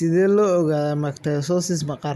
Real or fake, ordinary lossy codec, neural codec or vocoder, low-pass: real; none; none; 14.4 kHz